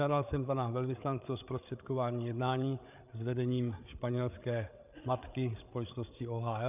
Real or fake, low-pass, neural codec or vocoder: fake; 3.6 kHz; codec, 16 kHz, 8 kbps, FreqCodec, larger model